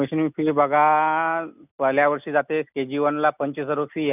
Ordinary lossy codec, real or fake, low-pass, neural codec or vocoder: none; real; 3.6 kHz; none